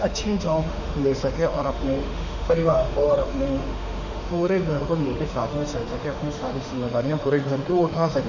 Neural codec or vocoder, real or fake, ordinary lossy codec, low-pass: autoencoder, 48 kHz, 32 numbers a frame, DAC-VAE, trained on Japanese speech; fake; none; 7.2 kHz